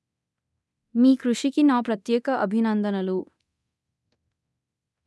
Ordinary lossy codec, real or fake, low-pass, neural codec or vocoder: none; fake; none; codec, 24 kHz, 0.9 kbps, DualCodec